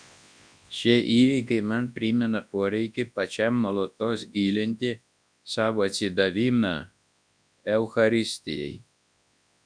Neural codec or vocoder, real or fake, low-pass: codec, 24 kHz, 0.9 kbps, WavTokenizer, large speech release; fake; 9.9 kHz